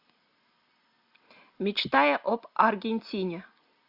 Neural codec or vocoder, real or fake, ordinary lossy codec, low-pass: none; real; AAC, 48 kbps; 5.4 kHz